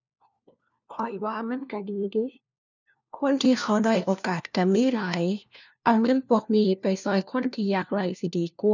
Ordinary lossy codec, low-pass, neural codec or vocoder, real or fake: none; 7.2 kHz; codec, 16 kHz, 1 kbps, FunCodec, trained on LibriTTS, 50 frames a second; fake